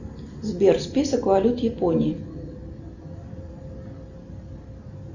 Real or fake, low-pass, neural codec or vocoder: real; 7.2 kHz; none